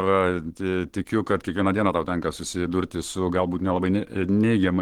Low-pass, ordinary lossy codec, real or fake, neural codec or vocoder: 19.8 kHz; Opus, 16 kbps; fake; codec, 44.1 kHz, 7.8 kbps, Pupu-Codec